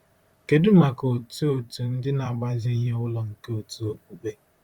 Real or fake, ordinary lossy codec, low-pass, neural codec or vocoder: fake; Opus, 64 kbps; 19.8 kHz; vocoder, 44.1 kHz, 128 mel bands, Pupu-Vocoder